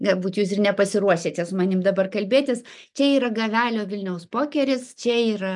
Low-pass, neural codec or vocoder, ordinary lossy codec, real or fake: 10.8 kHz; none; MP3, 96 kbps; real